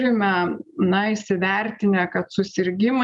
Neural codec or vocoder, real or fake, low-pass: none; real; 10.8 kHz